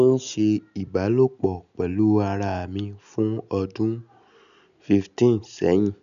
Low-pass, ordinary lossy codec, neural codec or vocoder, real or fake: 7.2 kHz; none; none; real